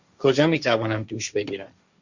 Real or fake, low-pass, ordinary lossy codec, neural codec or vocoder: fake; 7.2 kHz; Opus, 64 kbps; codec, 16 kHz, 1.1 kbps, Voila-Tokenizer